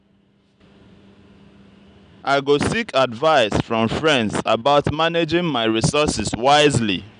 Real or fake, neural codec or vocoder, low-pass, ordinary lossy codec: real; none; 9.9 kHz; none